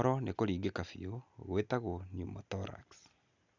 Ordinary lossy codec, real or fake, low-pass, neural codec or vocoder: none; real; 7.2 kHz; none